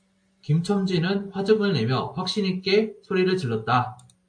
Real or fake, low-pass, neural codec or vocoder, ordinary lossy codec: real; 9.9 kHz; none; AAC, 64 kbps